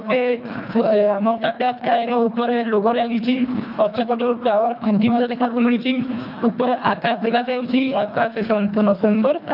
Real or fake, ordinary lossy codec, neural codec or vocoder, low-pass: fake; none; codec, 24 kHz, 1.5 kbps, HILCodec; 5.4 kHz